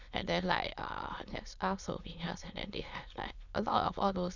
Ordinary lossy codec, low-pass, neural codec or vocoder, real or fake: none; 7.2 kHz; autoencoder, 22.05 kHz, a latent of 192 numbers a frame, VITS, trained on many speakers; fake